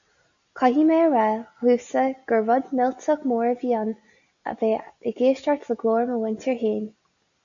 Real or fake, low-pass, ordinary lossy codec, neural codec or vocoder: real; 7.2 kHz; AAC, 64 kbps; none